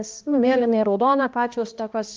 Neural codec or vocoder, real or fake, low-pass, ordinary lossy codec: codec, 16 kHz, 1 kbps, X-Codec, HuBERT features, trained on balanced general audio; fake; 7.2 kHz; Opus, 24 kbps